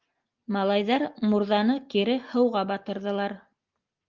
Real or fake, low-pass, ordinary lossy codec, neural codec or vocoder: real; 7.2 kHz; Opus, 24 kbps; none